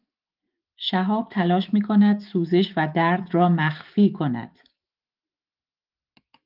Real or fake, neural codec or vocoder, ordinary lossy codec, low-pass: fake; autoencoder, 48 kHz, 128 numbers a frame, DAC-VAE, trained on Japanese speech; Opus, 32 kbps; 5.4 kHz